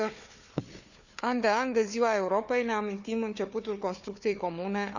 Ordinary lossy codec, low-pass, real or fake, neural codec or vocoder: none; 7.2 kHz; fake; codec, 16 kHz, 4 kbps, FunCodec, trained on Chinese and English, 50 frames a second